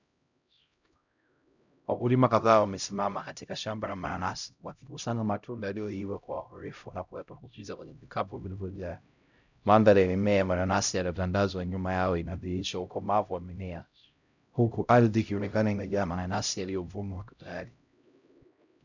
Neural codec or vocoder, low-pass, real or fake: codec, 16 kHz, 0.5 kbps, X-Codec, HuBERT features, trained on LibriSpeech; 7.2 kHz; fake